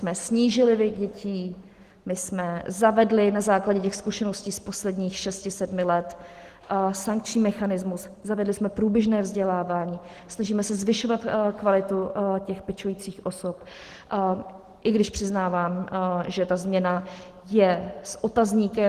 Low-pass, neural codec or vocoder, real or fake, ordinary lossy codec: 14.4 kHz; none; real; Opus, 16 kbps